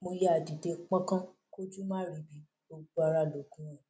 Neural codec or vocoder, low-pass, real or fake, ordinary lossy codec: none; none; real; none